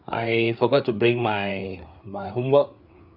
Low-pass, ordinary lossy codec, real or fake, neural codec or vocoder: 5.4 kHz; Opus, 64 kbps; fake; codec, 16 kHz, 4 kbps, FreqCodec, larger model